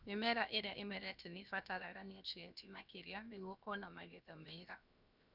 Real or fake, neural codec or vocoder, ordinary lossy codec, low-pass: fake; codec, 16 kHz, 0.7 kbps, FocalCodec; none; 5.4 kHz